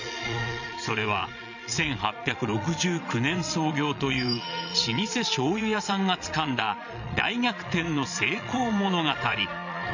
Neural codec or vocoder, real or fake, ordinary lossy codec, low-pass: vocoder, 22.05 kHz, 80 mel bands, Vocos; fake; none; 7.2 kHz